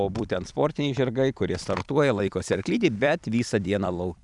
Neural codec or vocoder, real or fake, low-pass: vocoder, 44.1 kHz, 128 mel bands every 256 samples, BigVGAN v2; fake; 10.8 kHz